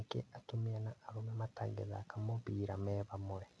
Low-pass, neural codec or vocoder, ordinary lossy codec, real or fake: none; none; none; real